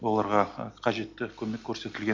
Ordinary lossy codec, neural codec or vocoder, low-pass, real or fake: MP3, 48 kbps; none; 7.2 kHz; real